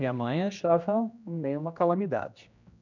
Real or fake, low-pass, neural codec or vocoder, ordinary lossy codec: fake; 7.2 kHz; codec, 16 kHz, 1 kbps, X-Codec, HuBERT features, trained on general audio; none